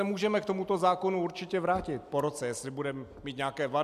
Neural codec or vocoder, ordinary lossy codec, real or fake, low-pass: none; AAC, 96 kbps; real; 14.4 kHz